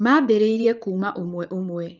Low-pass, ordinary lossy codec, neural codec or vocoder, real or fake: 7.2 kHz; Opus, 32 kbps; vocoder, 44.1 kHz, 80 mel bands, Vocos; fake